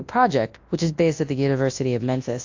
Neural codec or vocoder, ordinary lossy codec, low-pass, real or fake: codec, 24 kHz, 0.9 kbps, WavTokenizer, large speech release; AAC, 48 kbps; 7.2 kHz; fake